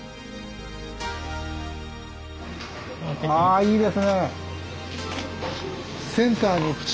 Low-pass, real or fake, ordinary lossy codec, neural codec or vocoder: none; real; none; none